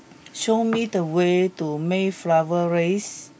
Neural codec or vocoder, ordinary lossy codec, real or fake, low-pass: none; none; real; none